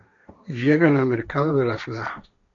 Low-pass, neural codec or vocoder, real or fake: 7.2 kHz; codec, 16 kHz, 1.1 kbps, Voila-Tokenizer; fake